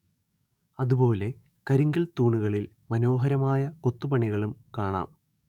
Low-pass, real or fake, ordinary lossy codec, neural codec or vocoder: 19.8 kHz; fake; none; codec, 44.1 kHz, 7.8 kbps, DAC